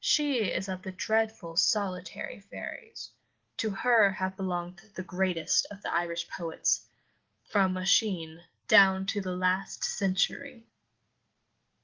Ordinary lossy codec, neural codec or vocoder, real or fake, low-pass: Opus, 16 kbps; none; real; 7.2 kHz